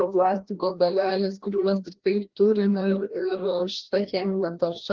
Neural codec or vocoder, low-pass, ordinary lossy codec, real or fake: codec, 16 kHz, 1 kbps, FreqCodec, larger model; 7.2 kHz; Opus, 16 kbps; fake